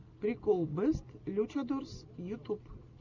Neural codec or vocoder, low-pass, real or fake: none; 7.2 kHz; real